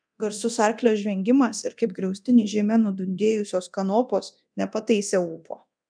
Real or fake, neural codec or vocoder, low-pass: fake; codec, 24 kHz, 0.9 kbps, DualCodec; 9.9 kHz